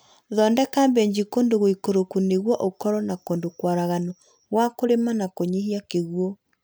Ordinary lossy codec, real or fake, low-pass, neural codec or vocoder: none; real; none; none